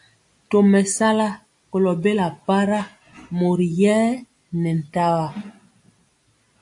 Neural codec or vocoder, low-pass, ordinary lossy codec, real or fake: none; 10.8 kHz; AAC, 64 kbps; real